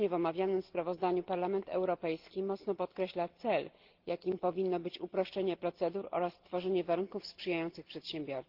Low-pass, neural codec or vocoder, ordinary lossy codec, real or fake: 5.4 kHz; none; Opus, 16 kbps; real